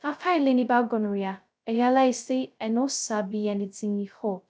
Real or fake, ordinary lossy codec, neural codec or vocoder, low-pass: fake; none; codec, 16 kHz, 0.2 kbps, FocalCodec; none